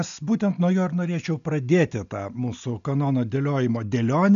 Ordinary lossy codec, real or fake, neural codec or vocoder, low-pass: AAC, 96 kbps; real; none; 7.2 kHz